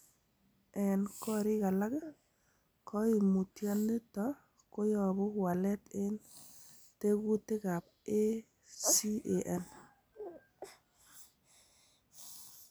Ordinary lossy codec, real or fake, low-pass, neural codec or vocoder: none; real; none; none